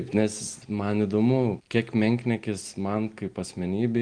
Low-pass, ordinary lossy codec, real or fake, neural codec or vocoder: 9.9 kHz; Opus, 32 kbps; real; none